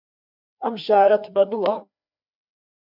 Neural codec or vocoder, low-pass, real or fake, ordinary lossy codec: codec, 16 kHz, 2 kbps, FreqCodec, larger model; 5.4 kHz; fake; MP3, 48 kbps